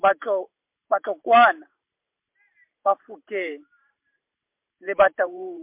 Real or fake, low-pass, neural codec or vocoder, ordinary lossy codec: fake; 3.6 kHz; vocoder, 44.1 kHz, 128 mel bands every 512 samples, BigVGAN v2; MP3, 32 kbps